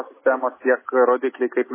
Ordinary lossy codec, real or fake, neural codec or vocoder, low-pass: MP3, 16 kbps; real; none; 3.6 kHz